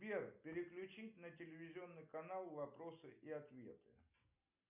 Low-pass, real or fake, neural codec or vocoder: 3.6 kHz; real; none